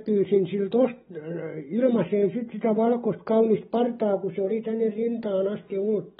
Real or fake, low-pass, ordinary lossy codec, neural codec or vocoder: real; 19.8 kHz; AAC, 16 kbps; none